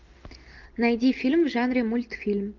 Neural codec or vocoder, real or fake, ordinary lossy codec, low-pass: none; real; Opus, 24 kbps; 7.2 kHz